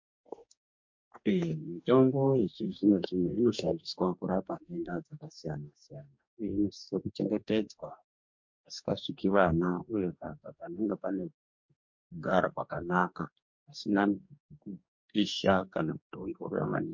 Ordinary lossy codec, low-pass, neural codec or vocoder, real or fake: MP3, 48 kbps; 7.2 kHz; codec, 44.1 kHz, 2.6 kbps, DAC; fake